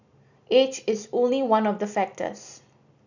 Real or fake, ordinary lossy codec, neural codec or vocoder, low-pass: real; none; none; 7.2 kHz